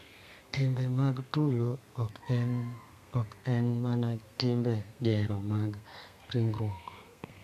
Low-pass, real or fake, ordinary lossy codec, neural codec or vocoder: 14.4 kHz; fake; none; codec, 32 kHz, 1.9 kbps, SNAC